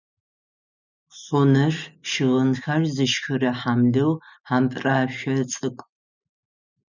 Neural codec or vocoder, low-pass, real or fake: none; 7.2 kHz; real